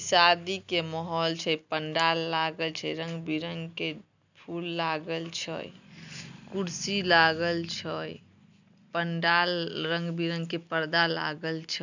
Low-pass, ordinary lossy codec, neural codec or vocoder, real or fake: 7.2 kHz; none; none; real